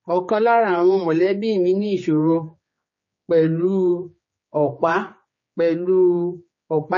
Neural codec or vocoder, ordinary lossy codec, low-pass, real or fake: codec, 16 kHz, 4 kbps, X-Codec, HuBERT features, trained on general audio; MP3, 32 kbps; 7.2 kHz; fake